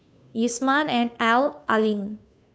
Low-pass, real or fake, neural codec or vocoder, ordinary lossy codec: none; fake; codec, 16 kHz, 2 kbps, FunCodec, trained on Chinese and English, 25 frames a second; none